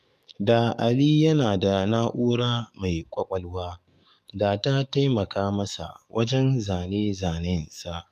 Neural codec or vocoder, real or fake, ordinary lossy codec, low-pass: codec, 44.1 kHz, 7.8 kbps, DAC; fake; none; 14.4 kHz